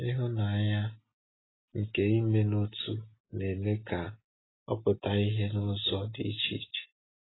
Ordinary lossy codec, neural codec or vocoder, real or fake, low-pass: AAC, 16 kbps; none; real; 7.2 kHz